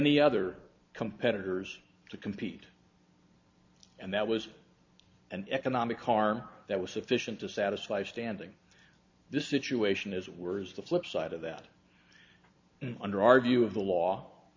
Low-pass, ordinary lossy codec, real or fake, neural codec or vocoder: 7.2 kHz; MP3, 64 kbps; real; none